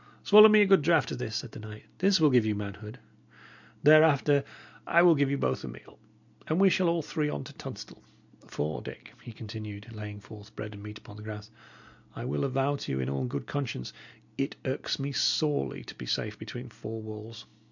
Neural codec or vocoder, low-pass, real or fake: none; 7.2 kHz; real